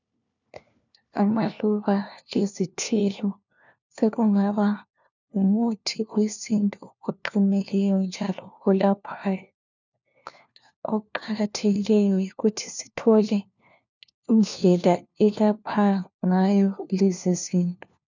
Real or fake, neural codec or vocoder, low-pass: fake; codec, 16 kHz, 1 kbps, FunCodec, trained on LibriTTS, 50 frames a second; 7.2 kHz